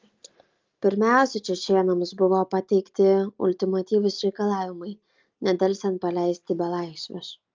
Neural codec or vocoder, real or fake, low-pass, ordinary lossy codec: none; real; 7.2 kHz; Opus, 24 kbps